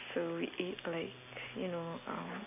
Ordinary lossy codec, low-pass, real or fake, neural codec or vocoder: AAC, 32 kbps; 3.6 kHz; real; none